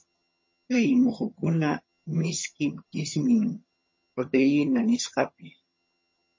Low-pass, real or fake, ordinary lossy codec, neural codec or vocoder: 7.2 kHz; fake; MP3, 32 kbps; vocoder, 22.05 kHz, 80 mel bands, HiFi-GAN